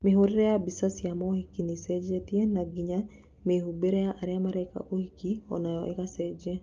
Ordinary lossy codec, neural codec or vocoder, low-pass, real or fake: Opus, 32 kbps; none; 7.2 kHz; real